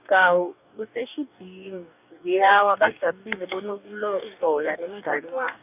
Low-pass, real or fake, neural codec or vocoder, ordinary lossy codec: 3.6 kHz; fake; codec, 44.1 kHz, 2.6 kbps, DAC; none